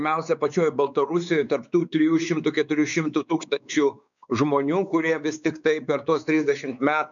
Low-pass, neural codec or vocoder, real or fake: 7.2 kHz; codec, 16 kHz, 4 kbps, X-Codec, WavLM features, trained on Multilingual LibriSpeech; fake